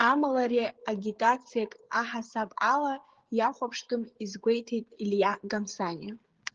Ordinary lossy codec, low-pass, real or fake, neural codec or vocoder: Opus, 16 kbps; 7.2 kHz; fake; codec, 16 kHz, 16 kbps, FreqCodec, smaller model